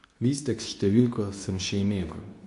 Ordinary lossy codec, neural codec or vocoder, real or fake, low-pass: none; codec, 24 kHz, 0.9 kbps, WavTokenizer, medium speech release version 2; fake; 10.8 kHz